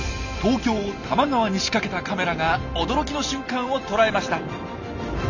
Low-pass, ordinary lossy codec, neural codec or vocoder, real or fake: 7.2 kHz; none; none; real